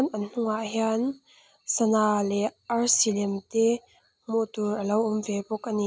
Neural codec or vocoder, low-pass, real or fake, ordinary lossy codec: none; none; real; none